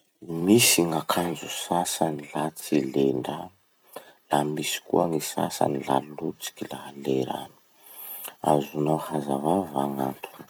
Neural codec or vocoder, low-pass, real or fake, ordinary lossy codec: vocoder, 44.1 kHz, 128 mel bands every 256 samples, BigVGAN v2; none; fake; none